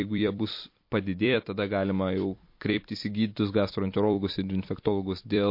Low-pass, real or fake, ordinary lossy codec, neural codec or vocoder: 5.4 kHz; fake; MP3, 32 kbps; vocoder, 44.1 kHz, 128 mel bands every 256 samples, BigVGAN v2